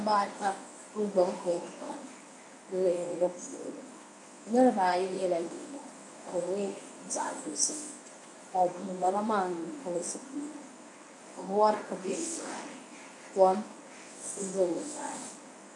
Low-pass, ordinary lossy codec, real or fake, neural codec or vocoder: 10.8 kHz; MP3, 96 kbps; fake; codec, 24 kHz, 0.9 kbps, WavTokenizer, medium speech release version 1